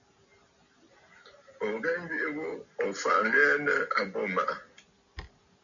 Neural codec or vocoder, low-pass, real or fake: none; 7.2 kHz; real